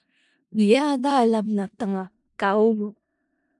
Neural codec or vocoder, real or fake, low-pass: codec, 16 kHz in and 24 kHz out, 0.4 kbps, LongCat-Audio-Codec, four codebook decoder; fake; 10.8 kHz